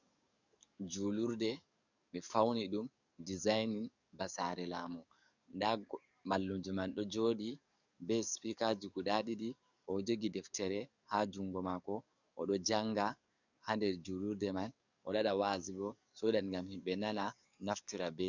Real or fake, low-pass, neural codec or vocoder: fake; 7.2 kHz; codec, 44.1 kHz, 7.8 kbps, DAC